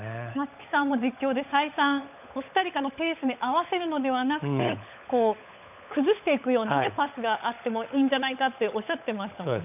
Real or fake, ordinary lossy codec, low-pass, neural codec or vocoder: fake; none; 3.6 kHz; codec, 16 kHz, 16 kbps, FunCodec, trained on LibriTTS, 50 frames a second